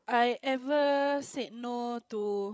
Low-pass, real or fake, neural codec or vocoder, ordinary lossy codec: none; fake; codec, 16 kHz, 16 kbps, FreqCodec, larger model; none